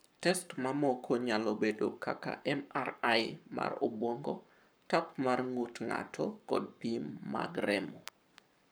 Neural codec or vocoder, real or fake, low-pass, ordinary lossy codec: codec, 44.1 kHz, 7.8 kbps, Pupu-Codec; fake; none; none